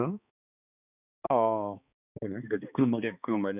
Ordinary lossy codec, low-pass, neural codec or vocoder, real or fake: none; 3.6 kHz; codec, 16 kHz, 2 kbps, X-Codec, HuBERT features, trained on balanced general audio; fake